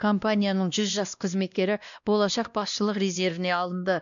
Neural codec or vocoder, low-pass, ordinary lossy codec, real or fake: codec, 16 kHz, 1 kbps, X-Codec, WavLM features, trained on Multilingual LibriSpeech; 7.2 kHz; none; fake